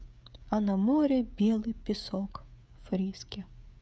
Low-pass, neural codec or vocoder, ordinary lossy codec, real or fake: none; codec, 16 kHz, 16 kbps, FreqCodec, smaller model; none; fake